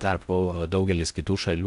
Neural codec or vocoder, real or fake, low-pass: codec, 16 kHz in and 24 kHz out, 0.6 kbps, FocalCodec, streaming, 4096 codes; fake; 10.8 kHz